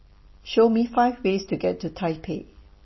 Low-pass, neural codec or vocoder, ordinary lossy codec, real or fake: 7.2 kHz; none; MP3, 24 kbps; real